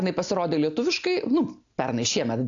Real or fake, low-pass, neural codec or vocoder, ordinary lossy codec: real; 7.2 kHz; none; AAC, 64 kbps